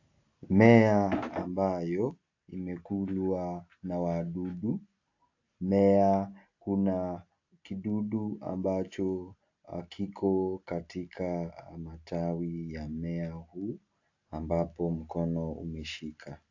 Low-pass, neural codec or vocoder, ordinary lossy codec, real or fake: 7.2 kHz; none; AAC, 48 kbps; real